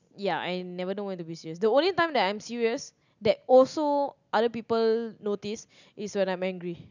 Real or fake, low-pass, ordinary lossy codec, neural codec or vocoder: real; 7.2 kHz; none; none